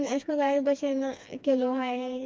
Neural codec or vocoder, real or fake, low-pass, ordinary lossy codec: codec, 16 kHz, 2 kbps, FreqCodec, smaller model; fake; none; none